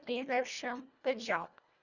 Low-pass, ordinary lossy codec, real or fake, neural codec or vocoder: 7.2 kHz; none; fake; codec, 24 kHz, 1.5 kbps, HILCodec